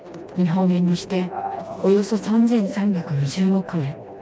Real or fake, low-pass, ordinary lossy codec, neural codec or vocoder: fake; none; none; codec, 16 kHz, 1 kbps, FreqCodec, smaller model